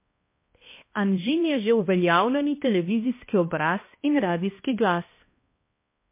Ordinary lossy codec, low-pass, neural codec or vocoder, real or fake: MP3, 24 kbps; 3.6 kHz; codec, 16 kHz, 1 kbps, X-Codec, HuBERT features, trained on balanced general audio; fake